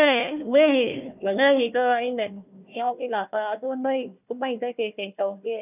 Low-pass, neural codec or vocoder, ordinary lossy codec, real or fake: 3.6 kHz; codec, 16 kHz, 1 kbps, FunCodec, trained on Chinese and English, 50 frames a second; none; fake